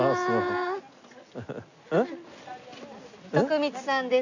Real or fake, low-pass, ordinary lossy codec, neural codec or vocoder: real; 7.2 kHz; none; none